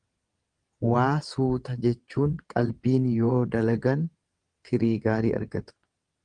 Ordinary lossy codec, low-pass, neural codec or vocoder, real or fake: Opus, 24 kbps; 9.9 kHz; vocoder, 22.05 kHz, 80 mel bands, WaveNeXt; fake